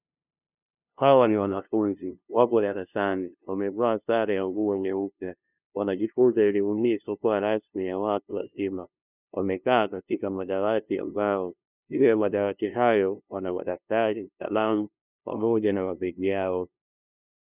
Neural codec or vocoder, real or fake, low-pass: codec, 16 kHz, 0.5 kbps, FunCodec, trained on LibriTTS, 25 frames a second; fake; 3.6 kHz